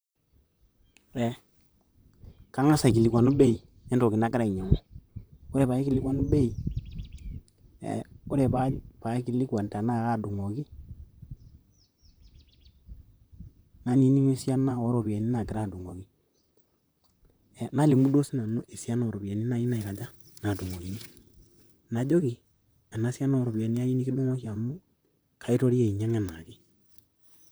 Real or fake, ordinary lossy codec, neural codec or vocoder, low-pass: fake; none; vocoder, 44.1 kHz, 128 mel bands, Pupu-Vocoder; none